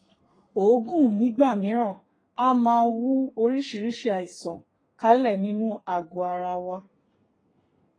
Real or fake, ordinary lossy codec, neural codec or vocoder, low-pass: fake; AAC, 32 kbps; codec, 32 kHz, 1.9 kbps, SNAC; 9.9 kHz